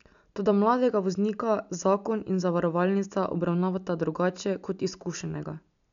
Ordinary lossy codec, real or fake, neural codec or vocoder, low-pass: none; real; none; 7.2 kHz